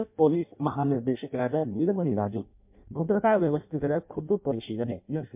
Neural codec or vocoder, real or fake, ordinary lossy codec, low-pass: codec, 16 kHz in and 24 kHz out, 0.6 kbps, FireRedTTS-2 codec; fake; MP3, 32 kbps; 3.6 kHz